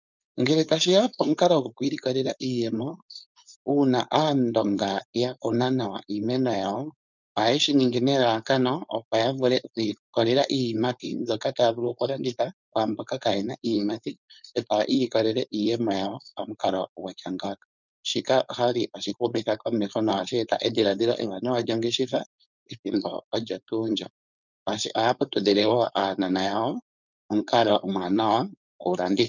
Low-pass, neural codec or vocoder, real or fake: 7.2 kHz; codec, 16 kHz, 4.8 kbps, FACodec; fake